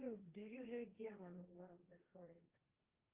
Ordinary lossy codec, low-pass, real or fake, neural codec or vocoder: Opus, 24 kbps; 3.6 kHz; fake; codec, 16 kHz, 1.1 kbps, Voila-Tokenizer